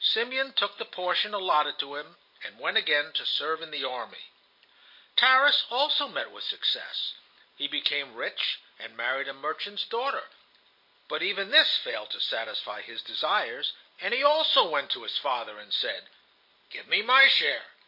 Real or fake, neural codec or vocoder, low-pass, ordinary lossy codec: real; none; 5.4 kHz; MP3, 32 kbps